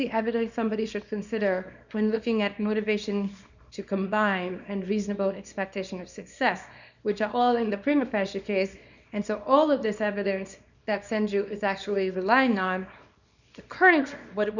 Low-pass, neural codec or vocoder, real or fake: 7.2 kHz; codec, 24 kHz, 0.9 kbps, WavTokenizer, small release; fake